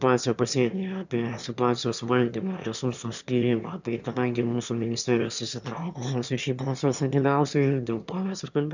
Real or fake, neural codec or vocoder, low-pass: fake; autoencoder, 22.05 kHz, a latent of 192 numbers a frame, VITS, trained on one speaker; 7.2 kHz